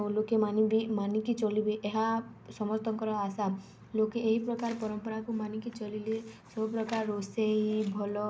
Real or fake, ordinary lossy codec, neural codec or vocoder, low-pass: real; none; none; none